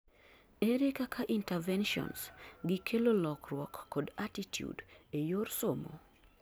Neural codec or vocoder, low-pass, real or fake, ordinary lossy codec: none; none; real; none